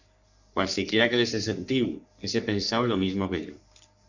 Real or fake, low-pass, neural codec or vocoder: fake; 7.2 kHz; codec, 44.1 kHz, 3.4 kbps, Pupu-Codec